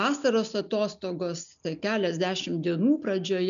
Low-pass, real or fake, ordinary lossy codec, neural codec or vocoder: 7.2 kHz; real; MP3, 96 kbps; none